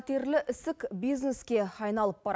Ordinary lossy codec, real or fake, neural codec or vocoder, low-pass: none; real; none; none